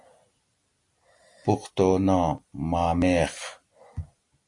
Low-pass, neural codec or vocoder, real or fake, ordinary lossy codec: 10.8 kHz; none; real; MP3, 48 kbps